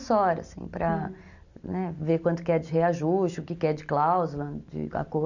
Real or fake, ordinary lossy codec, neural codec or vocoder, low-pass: real; none; none; 7.2 kHz